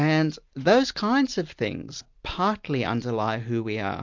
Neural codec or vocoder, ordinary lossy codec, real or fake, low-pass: none; MP3, 64 kbps; real; 7.2 kHz